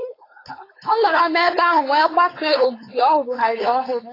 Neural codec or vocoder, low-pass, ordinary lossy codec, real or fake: codec, 16 kHz, 4.8 kbps, FACodec; 5.4 kHz; AAC, 24 kbps; fake